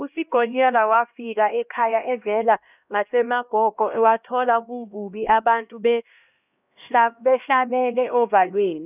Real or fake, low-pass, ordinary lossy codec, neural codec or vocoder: fake; 3.6 kHz; none; codec, 16 kHz, 1 kbps, X-Codec, HuBERT features, trained on LibriSpeech